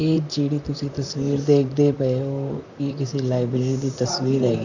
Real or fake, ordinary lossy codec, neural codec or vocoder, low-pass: fake; none; vocoder, 44.1 kHz, 128 mel bands, Pupu-Vocoder; 7.2 kHz